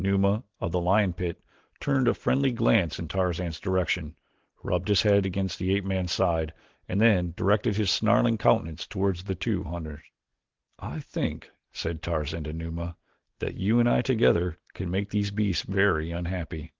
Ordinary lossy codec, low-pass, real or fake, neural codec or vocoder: Opus, 16 kbps; 7.2 kHz; real; none